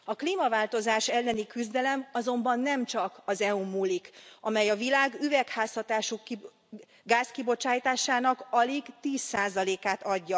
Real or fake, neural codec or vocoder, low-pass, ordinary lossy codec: real; none; none; none